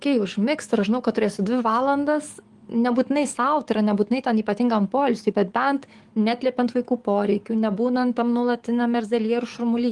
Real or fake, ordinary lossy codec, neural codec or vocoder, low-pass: fake; Opus, 24 kbps; codec, 44.1 kHz, 7.8 kbps, DAC; 10.8 kHz